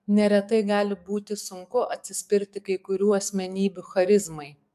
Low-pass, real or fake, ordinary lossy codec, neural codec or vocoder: 14.4 kHz; fake; AAC, 96 kbps; codec, 44.1 kHz, 7.8 kbps, DAC